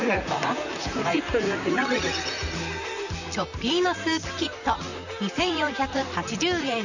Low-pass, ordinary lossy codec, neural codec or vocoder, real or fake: 7.2 kHz; none; vocoder, 44.1 kHz, 128 mel bands, Pupu-Vocoder; fake